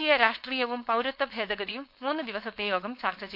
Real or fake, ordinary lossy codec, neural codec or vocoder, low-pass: fake; none; codec, 16 kHz, 4.8 kbps, FACodec; 5.4 kHz